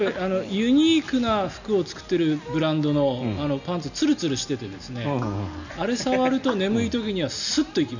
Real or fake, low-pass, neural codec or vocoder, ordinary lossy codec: real; 7.2 kHz; none; none